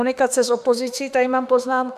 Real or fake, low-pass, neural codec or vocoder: fake; 14.4 kHz; autoencoder, 48 kHz, 32 numbers a frame, DAC-VAE, trained on Japanese speech